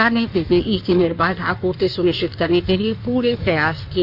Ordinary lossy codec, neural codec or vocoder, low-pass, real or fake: none; codec, 16 kHz in and 24 kHz out, 1.1 kbps, FireRedTTS-2 codec; 5.4 kHz; fake